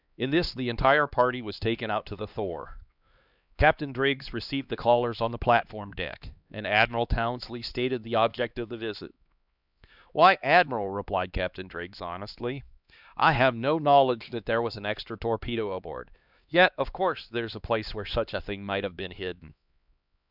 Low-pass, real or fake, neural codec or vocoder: 5.4 kHz; fake; codec, 16 kHz, 2 kbps, X-Codec, HuBERT features, trained on LibriSpeech